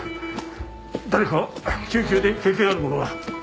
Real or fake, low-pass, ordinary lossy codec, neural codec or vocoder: real; none; none; none